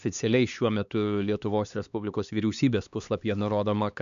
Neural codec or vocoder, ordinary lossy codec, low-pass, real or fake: codec, 16 kHz, 2 kbps, X-Codec, HuBERT features, trained on LibriSpeech; MP3, 96 kbps; 7.2 kHz; fake